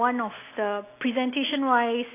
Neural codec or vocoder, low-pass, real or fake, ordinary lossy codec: none; 3.6 kHz; real; AAC, 24 kbps